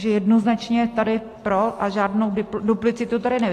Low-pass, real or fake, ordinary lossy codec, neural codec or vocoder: 14.4 kHz; real; AAC, 48 kbps; none